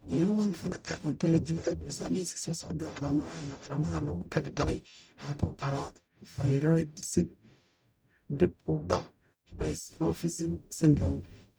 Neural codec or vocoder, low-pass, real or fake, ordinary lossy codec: codec, 44.1 kHz, 0.9 kbps, DAC; none; fake; none